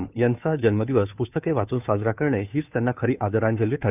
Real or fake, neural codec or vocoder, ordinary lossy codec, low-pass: fake; codec, 16 kHz, 2 kbps, FunCodec, trained on Chinese and English, 25 frames a second; none; 3.6 kHz